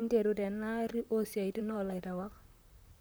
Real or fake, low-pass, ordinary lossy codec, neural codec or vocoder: fake; none; none; vocoder, 44.1 kHz, 128 mel bands, Pupu-Vocoder